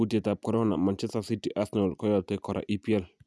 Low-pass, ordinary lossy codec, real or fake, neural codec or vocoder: none; none; real; none